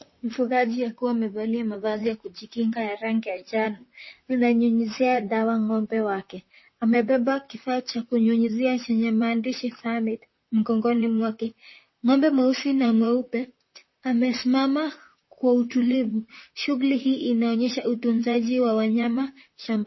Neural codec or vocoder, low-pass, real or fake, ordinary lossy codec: vocoder, 44.1 kHz, 128 mel bands, Pupu-Vocoder; 7.2 kHz; fake; MP3, 24 kbps